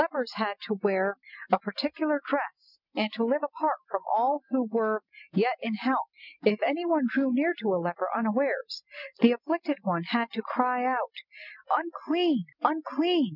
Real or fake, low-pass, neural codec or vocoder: real; 5.4 kHz; none